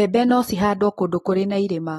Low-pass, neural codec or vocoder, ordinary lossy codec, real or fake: 19.8 kHz; none; AAC, 32 kbps; real